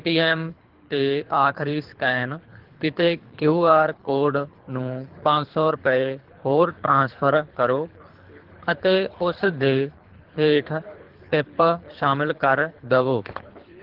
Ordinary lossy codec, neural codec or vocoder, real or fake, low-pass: Opus, 16 kbps; codec, 24 kHz, 3 kbps, HILCodec; fake; 5.4 kHz